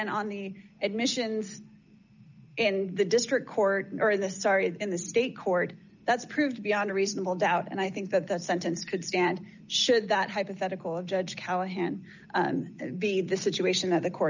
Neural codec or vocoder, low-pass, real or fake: none; 7.2 kHz; real